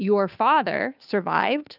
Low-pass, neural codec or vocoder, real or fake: 5.4 kHz; codec, 16 kHz, 2 kbps, FunCodec, trained on Chinese and English, 25 frames a second; fake